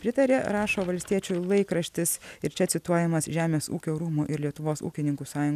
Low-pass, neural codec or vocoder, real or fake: 14.4 kHz; none; real